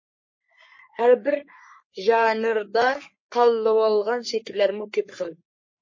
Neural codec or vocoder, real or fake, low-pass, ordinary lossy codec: codec, 44.1 kHz, 3.4 kbps, Pupu-Codec; fake; 7.2 kHz; MP3, 32 kbps